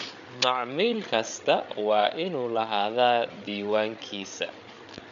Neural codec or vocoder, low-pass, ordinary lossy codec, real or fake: codec, 16 kHz, 8 kbps, FreqCodec, larger model; 7.2 kHz; MP3, 96 kbps; fake